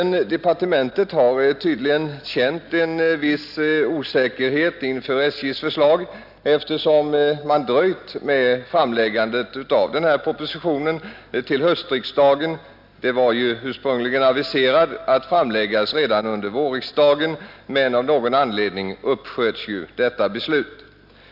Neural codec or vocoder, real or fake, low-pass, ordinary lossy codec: none; real; 5.4 kHz; none